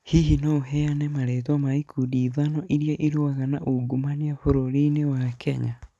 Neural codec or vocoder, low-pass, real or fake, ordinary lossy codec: none; none; real; none